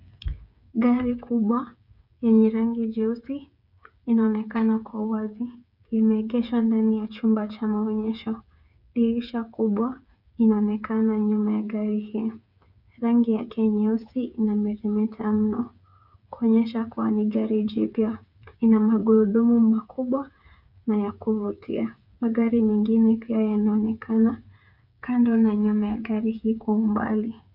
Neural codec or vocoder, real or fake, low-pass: codec, 16 kHz, 8 kbps, FreqCodec, smaller model; fake; 5.4 kHz